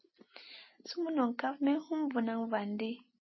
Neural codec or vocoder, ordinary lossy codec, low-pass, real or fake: none; MP3, 24 kbps; 7.2 kHz; real